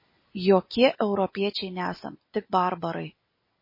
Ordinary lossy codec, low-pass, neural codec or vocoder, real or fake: MP3, 24 kbps; 5.4 kHz; none; real